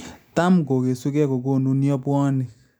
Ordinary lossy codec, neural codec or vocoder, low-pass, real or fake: none; none; none; real